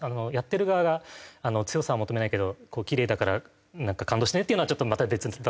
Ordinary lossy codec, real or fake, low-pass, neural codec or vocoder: none; real; none; none